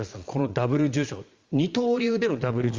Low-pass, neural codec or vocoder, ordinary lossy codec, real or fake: 7.2 kHz; vocoder, 22.05 kHz, 80 mel bands, Vocos; Opus, 32 kbps; fake